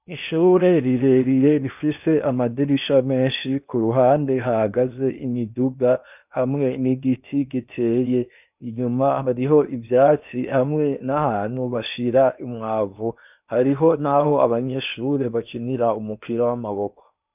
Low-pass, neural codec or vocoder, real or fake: 3.6 kHz; codec, 16 kHz in and 24 kHz out, 0.8 kbps, FocalCodec, streaming, 65536 codes; fake